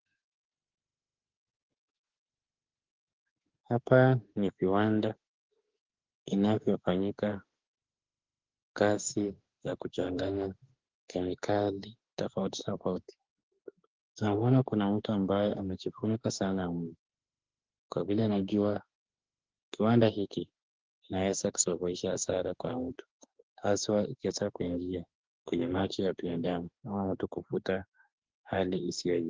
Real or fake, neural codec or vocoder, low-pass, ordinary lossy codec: fake; autoencoder, 48 kHz, 32 numbers a frame, DAC-VAE, trained on Japanese speech; 7.2 kHz; Opus, 16 kbps